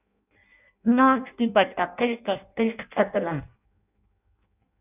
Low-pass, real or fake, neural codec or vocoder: 3.6 kHz; fake; codec, 16 kHz in and 24 kHz out, 0.6 kbps, FireRedTTS-2 codec